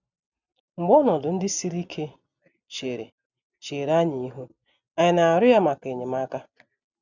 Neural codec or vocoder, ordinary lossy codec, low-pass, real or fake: none; none; 7.2 kHz; real